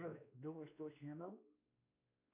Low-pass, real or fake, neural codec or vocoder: 3.6 kHz; fake; codec, 16 kHz, 2 kbps, X-Codec, WavLM features, trained on Multilingual LibriSpeech